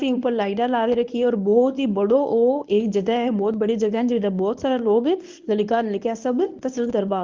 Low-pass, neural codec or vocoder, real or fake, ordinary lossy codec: 7.2 kHz; codec, 24 kHz, 0.9 kbps, WavTokenizer, medium speech release version 1; fake; Opus, 24 kbps